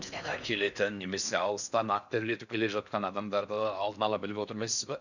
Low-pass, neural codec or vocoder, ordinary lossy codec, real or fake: 7.2 kHz; codec, 16 kHz in and 24 kHz out, 0.6 kbps, FocalCodec, streaming, 4096 codes; none; fake